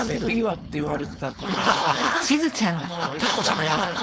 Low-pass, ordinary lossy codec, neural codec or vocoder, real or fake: none; none; codec, 16 kHz, 4.8 kbps, FACodec; fake